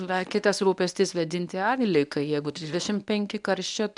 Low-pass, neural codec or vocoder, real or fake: 10.8 kHz; codec, 24 kHz, 0.9 kbps, WavTokenizer, medium speech release version 1; fake